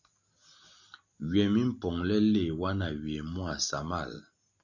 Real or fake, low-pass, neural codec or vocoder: real; 7.2 kHz; none